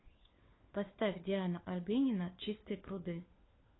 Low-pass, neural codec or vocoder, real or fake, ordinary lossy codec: 7.2 kHz; codec, 16 kHz, 4 kbps, FunCodec, trained on LibriTTS, 50 frames a second; fake; AAC, 16 kbps